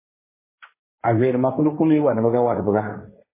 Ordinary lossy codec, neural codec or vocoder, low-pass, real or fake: MP3, 16 kbps; codec, 16 kHz, 1.1 kbps, Voila-Tokenizer; 3.6 kHz; fake